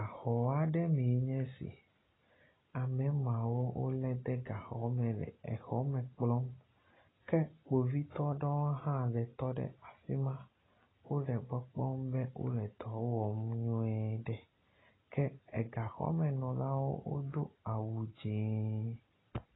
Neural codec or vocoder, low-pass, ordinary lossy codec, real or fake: none; 7.2 kHz; AAC, 16 kbps; real